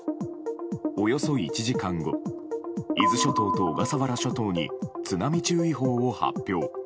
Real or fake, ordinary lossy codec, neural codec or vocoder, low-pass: real; none; none; none